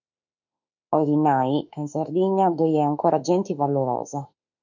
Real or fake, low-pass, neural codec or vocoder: fake; 7.2 kHz; autoencoder, 48 kHz, 32 numbers a frame, DAC-VAE, trained on Japanese speech